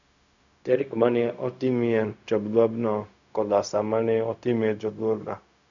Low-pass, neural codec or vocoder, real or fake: 7.2 kHz; codec, 16 kHz, 0.4 kbps, LongCat-Audio-Codec; fake